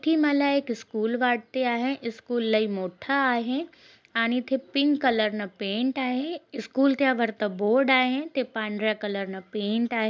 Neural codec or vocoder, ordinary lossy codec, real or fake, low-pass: none; none; real; none